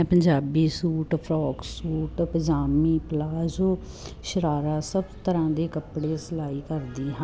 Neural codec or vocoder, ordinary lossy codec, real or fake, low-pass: none; none; real; none